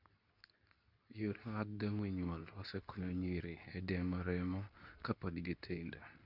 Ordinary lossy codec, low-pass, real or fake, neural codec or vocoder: none; 5.4 kHz; fake; codec, 24 kHz, 0.9 kbps, WavTokenizer, medium speech release version 2